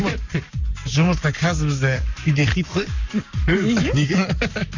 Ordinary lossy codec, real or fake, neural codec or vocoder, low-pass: none; fake; codec, 44.1 kHz, 7.8 kbps, Pupu-Codec; 7.2 kHz